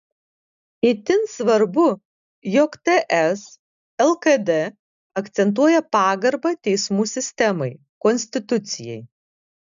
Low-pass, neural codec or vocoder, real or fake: 7.2 kHz; none; real